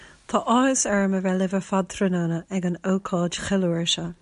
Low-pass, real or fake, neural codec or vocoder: 10.8 kHz; real; none